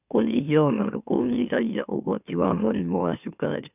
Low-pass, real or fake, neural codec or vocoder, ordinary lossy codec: 3.6 kHz; fake; autoencoder, 44.1 kHz, a latent of 192 numbers a frame, MeloTTS; none